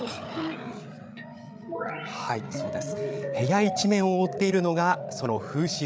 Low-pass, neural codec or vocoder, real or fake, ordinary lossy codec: none; codec, 16 kHz, 8 kbps, FreqCodec, larger model; fake; none